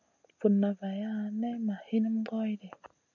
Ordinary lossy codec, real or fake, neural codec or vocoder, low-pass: MP3, 64 kbps; real; none; 7.2 kHz